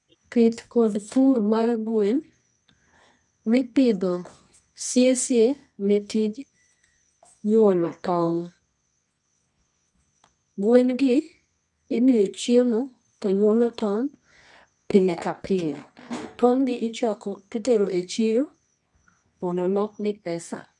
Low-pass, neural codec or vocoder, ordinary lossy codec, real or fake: 10.8 kHz; codec, 24 kHz, 0.9 kbps, WavTokenizer, medium music audio release; none; fake